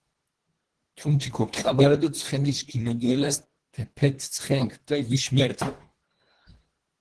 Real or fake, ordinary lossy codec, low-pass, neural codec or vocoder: fake; Opus, 16 kbps; 10.8 kHz; codec, 24 kHz, 1.5 kbps, HILCodec